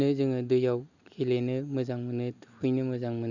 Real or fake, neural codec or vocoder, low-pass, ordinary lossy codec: real; none; 7.2 kHz; none